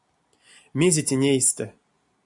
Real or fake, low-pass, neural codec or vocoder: real; 10.8 kHz; none